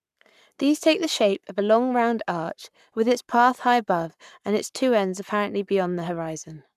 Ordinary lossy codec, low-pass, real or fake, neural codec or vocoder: none; 14.4 kHz; fake; codec, 44.1 kHz, 7.8 kbps, Pupu-Codec